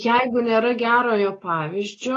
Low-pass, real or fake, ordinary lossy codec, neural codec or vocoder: 10.8 kHz; real; AAC, 32 kbps; none